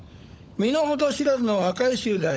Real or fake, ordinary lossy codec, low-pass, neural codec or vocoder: fake; none; none; codec, 16 kHz, 16 kbps, FunCodec, trained on LibriTTS, 50 frames a second